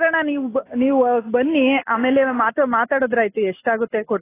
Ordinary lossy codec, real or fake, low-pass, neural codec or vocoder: AAC, 16 kbps; real; 3.6 kHz; none